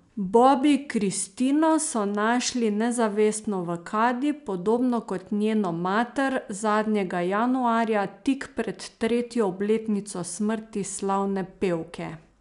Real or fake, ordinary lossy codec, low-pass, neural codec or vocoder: real; none; 10.8 kHz; none